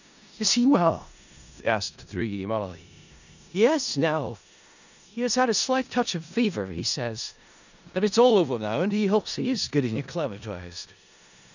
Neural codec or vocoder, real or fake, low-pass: codec, 16 kHz in and 24 kHz out, 0.4 kbps, LongCat-Audio-Codec, four codebook decoder; fake; 7.2 kHz